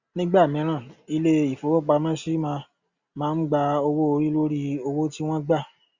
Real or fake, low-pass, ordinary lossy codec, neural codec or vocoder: real; 7.2 kHz; Opus, 64 kbps; none